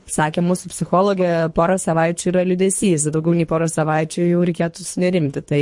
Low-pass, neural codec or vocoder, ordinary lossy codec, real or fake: 10.8 kHz; codec, 24 kHz, 3 kbps, HILCodec; MP3, 48 kbps; fake